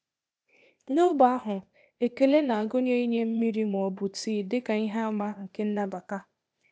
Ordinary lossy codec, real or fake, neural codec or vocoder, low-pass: none; fake; codec, 16 kHz, 0.8 kbps, ZipCodec; none